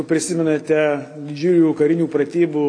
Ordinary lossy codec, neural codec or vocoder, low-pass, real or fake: AAC, 48 kbps; none; 9.9 kHz; real